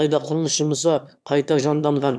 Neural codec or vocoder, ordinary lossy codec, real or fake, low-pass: autoencoder, 22.05 kHz, a latent of 192 numbers a frame, VITS, trained on one speaker; none; fake; none